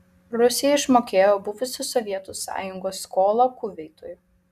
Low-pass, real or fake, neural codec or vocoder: 14.4 kHz; real; none